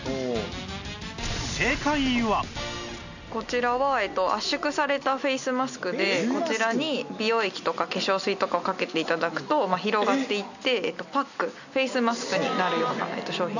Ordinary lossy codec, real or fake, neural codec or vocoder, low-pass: none; real; none; 7.2 kHz